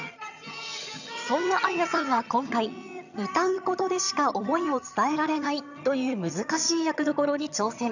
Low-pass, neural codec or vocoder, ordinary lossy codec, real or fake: 7.2 kHz; vocoder, 22.05 kHz, 80 mel bands, HiFi-GAN; none; fake